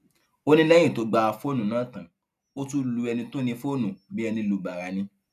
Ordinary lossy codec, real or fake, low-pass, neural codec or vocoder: none; real; 14.4 kHz; none